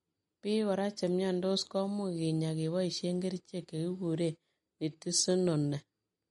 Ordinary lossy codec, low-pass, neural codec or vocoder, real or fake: MP3, 48 kbps; 19.8 kHz; none; real